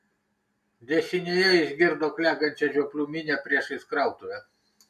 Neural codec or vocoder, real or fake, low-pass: none; real; 14.4 kHz